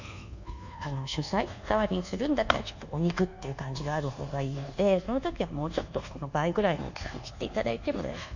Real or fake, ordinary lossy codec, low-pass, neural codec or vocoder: fake; none; 7.2 kHz; codec, 24 kHz, 1.2 kbps, DualCodec